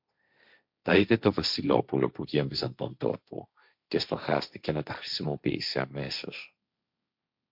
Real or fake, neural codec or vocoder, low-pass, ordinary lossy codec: fake; codec, 16 kHz, 1.1 kbps, Voila-Tokenizer; 5.4 kHz; MP3, 48 kbps